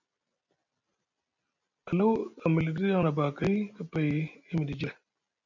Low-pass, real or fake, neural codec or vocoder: 7.2 kHz; real; none